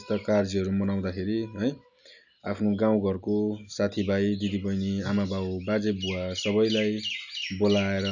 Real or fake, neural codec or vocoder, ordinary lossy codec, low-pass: real; none; none; 7.2 kHz